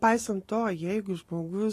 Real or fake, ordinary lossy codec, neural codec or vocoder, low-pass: real; AAC, 48 kbps; none; 14.4 kHz